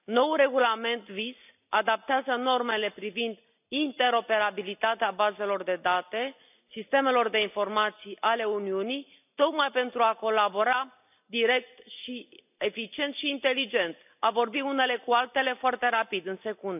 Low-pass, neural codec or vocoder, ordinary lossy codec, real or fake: 3.6 kHz; none; none; real